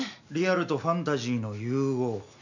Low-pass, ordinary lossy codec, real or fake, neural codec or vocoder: 7.2 kHz; none; real; none